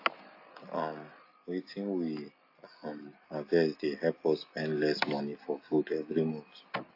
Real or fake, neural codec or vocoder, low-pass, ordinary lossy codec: real; none; 5.4 kHz; AAC, 32 kbps